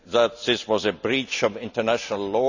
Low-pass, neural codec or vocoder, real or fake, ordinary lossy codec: 7.2 kHz; none; real; none